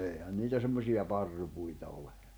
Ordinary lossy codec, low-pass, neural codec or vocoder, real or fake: none; none; none; real